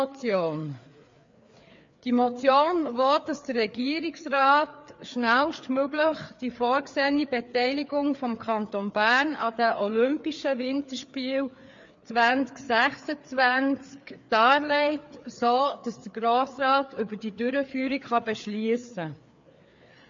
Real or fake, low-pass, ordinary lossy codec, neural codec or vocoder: fake; 7.2 kHz; MP3, 48 kbps; codec, 16 kHz, 4 kbps, FreqCodec, larger model